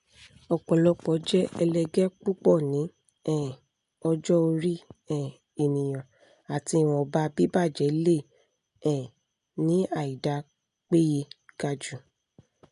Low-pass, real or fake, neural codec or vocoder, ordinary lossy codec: 10.8 kHz; real; none; none